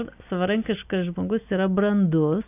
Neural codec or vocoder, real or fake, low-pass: none; real; 3.6 kHz